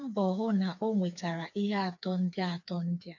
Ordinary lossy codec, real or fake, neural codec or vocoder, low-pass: none; fake; codec, 16 kHz, 4 kbps, FreqCodec, smaller model; 7.2 kHz